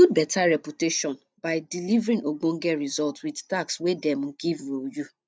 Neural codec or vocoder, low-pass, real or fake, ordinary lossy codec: none; none; real; none